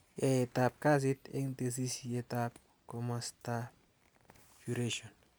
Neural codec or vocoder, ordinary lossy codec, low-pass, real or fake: none; none; none; real